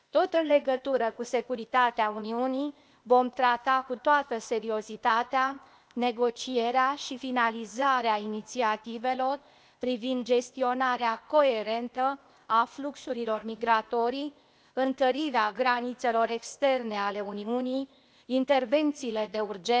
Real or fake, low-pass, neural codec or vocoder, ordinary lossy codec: fake; none; codec, 16 kHz, 0.8 kbps, ZipCodec; none